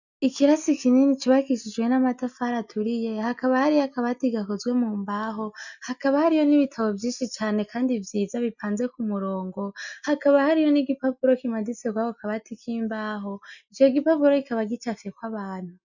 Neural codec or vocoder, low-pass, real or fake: none; 7.2 kHz; real